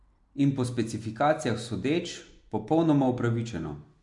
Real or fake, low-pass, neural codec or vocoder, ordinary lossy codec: real; 10.8 kHz; none; MP3, 64 kbps